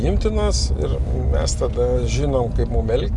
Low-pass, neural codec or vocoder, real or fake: 10.8 kHz; none; real